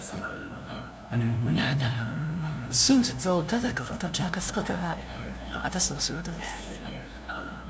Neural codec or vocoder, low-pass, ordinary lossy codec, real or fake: codec, 16 kHz, 0.5 kbps, FunCodec, trained on LibriTTS, 25 frames a second; none; none; fake